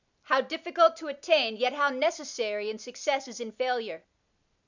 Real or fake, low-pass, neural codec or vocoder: real; 7.2 kHz; none